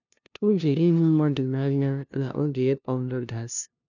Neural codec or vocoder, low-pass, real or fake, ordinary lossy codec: codec, 16 kHz, 0.5 kbps, FunCodec, trained on LibriTTS, 25 frames a second; 7.2 kHz; fake; none